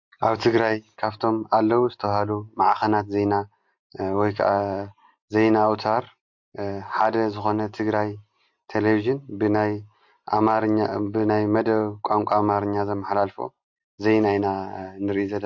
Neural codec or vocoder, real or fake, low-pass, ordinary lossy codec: none; real; 7.2 kHz; MP3, 48 kbps